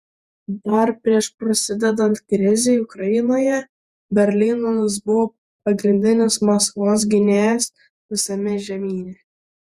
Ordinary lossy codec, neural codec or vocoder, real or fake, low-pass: Opus, 64 kbps; vocoder, 48 kHz, 128 mel bands, Vocos; fake; 14.4 kHz